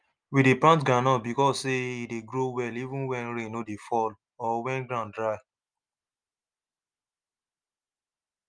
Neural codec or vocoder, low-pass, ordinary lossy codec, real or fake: none; 9.9 kHz; Opus, 32 kbps; real